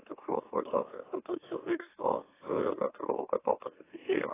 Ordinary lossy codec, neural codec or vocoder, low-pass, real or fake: AAC, 16 kbps; autoencoder, 44.1 kHz, a latent of 192 numbers a frame, MeloTTS; 3.6 kHz; fake